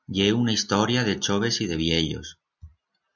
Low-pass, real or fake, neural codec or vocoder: 7.2 kHz; real; none